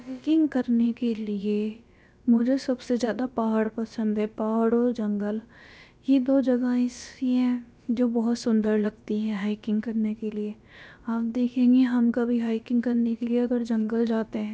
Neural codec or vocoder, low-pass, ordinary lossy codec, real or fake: codec, 16 kHz, about 1 kbps, DyCAST, with the encoder's durations; none; none; fake